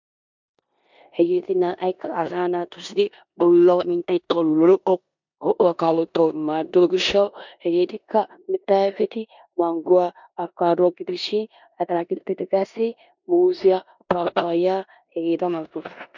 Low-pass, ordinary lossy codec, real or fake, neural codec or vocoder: 7.2 kHz; MP3, 64 kbps; fake; codec, 16 kHz in and 24 kHz out, 0.9 kbps, LongCat-Audio-Codec, four codebook decoder